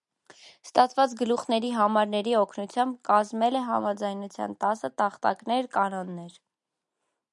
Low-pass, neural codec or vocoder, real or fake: 10.8 kHz; none; real